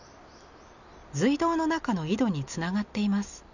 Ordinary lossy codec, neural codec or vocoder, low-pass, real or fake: none; none; 7.2 kHz; real